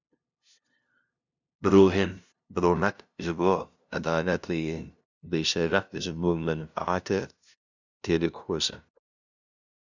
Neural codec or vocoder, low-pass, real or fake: codec, 16 kHz, 0.5 kbps, FunCodec, trained on LibriTTS, 25 frames a second; 7.2 kHz; fake